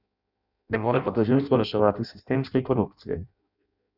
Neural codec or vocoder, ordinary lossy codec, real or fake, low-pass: codec, 16 kHz in and 24 kHz out, 0.6 kbps, FireRedTTS-2 codec; none; fake; 5.4 kHz